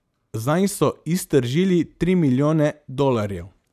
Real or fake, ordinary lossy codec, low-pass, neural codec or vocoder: real; none; 14.4 kHz; none